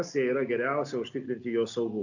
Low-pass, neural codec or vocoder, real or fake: 7.2 kHz; none; real